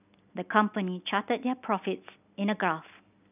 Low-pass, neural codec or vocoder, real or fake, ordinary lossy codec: 3.6 kHz; none; real; none